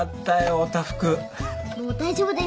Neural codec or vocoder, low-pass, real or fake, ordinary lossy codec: none; none; real; none